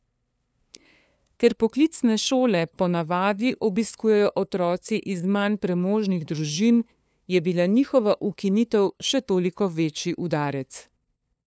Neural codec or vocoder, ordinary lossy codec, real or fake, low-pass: codec, 16 kHz, 2 kbps, FunCodec, trained on LibriTTS, 25 frames a second; none; fake; none